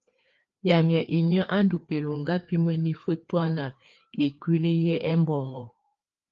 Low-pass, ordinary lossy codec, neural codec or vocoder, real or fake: 7.2 kHz; Opus, 32 kbps; codec, 16 kHz, 2 kbps, FreqCodec, larger model; fake